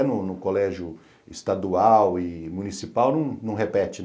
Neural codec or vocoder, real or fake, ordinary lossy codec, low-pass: none; real; none; none